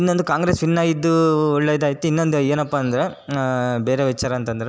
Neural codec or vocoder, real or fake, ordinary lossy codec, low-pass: none; real; none; none